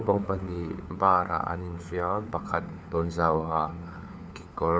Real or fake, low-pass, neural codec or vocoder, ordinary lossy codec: fake; none; codec, 16 kHz, 4 kbps, FunCodec, trained on LibriTTS, 50 frames a second; none